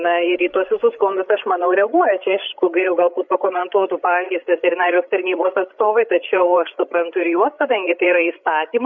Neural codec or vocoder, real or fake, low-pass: codec, 16 kHz, 8 kbps, FreqCodec, larger model; fake; 7.2 kHz